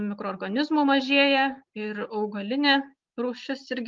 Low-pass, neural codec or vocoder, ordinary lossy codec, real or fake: 7.2 kHz; none; Opus, 32 kbps; real